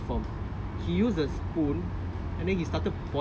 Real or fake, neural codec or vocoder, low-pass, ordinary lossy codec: real; none; none; none